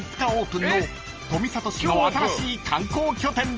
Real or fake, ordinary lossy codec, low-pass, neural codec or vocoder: real; Opus, 24 kbps; 7.2 kHz; none